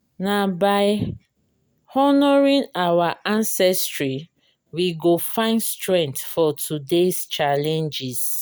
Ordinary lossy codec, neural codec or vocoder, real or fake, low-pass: none; none; real; none